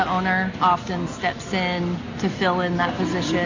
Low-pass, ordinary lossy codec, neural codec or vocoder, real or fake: 7.2 kHz; AAC, 48 kbps; none; real